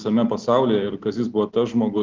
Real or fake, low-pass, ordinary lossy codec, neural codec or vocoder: real; 7.2 kHz; Opus, 24 kbps; none